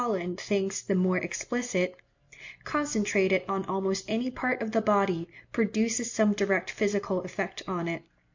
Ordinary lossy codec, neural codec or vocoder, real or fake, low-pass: MP3, 48 kbps; none; real; 7.2 kHz